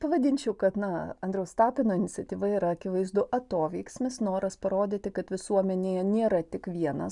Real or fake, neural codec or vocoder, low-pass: real; none; 10.8 kHz